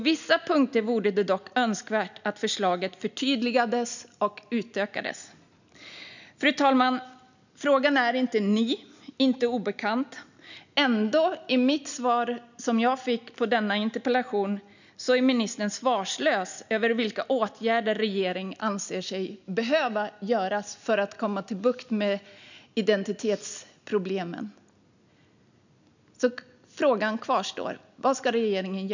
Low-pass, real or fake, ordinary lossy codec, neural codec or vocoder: 7.2 kHz; real; none; none